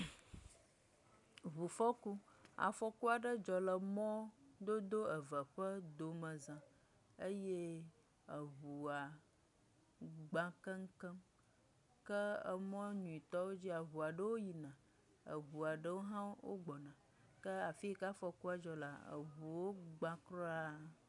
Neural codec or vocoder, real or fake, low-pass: none; real; 10.8 kHz